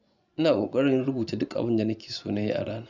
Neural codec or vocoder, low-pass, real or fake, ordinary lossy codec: vocoder, 24 kHz, 100 mel bands, Vocos; 7.2 kHz; fake; none